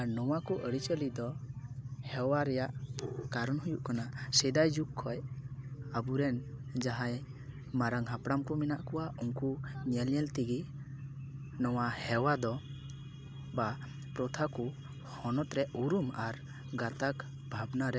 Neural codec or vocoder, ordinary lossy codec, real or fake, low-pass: none; none; real; none